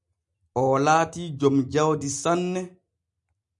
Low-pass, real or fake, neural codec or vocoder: 10.8 kHz; real; none